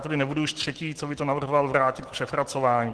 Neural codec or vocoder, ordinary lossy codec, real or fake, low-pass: autoencoder, 48 kHz, 128 numbers a frame, DAC-VAE, trained on Japanese speech; Opus, 16 kbps; fake; 10.8 kHz